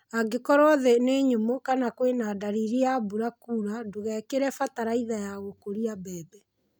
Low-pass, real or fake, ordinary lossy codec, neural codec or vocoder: none; real; none; none